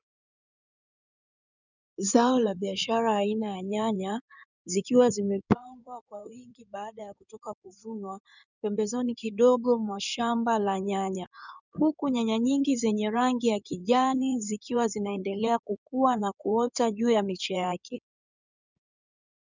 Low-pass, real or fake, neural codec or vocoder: 7.2 kHz; fake; codec, 16 kHz in and 24 kHz out, 2.2 kbps, FireRedTTS-2 codec